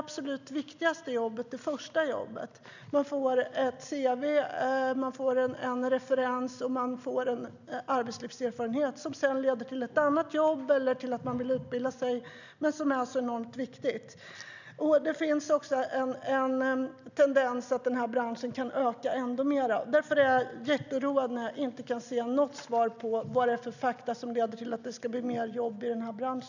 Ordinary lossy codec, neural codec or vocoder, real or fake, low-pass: none; none; real; 7.2 kHz